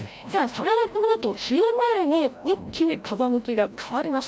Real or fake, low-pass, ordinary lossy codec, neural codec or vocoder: fake; none; none; codec, 16 kHz, 0.5 kbps, FreqCodec, larger model